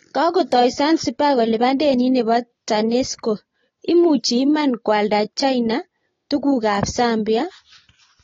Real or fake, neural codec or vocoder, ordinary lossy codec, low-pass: real; none; AAC, 32 kbps; 7.2 kHz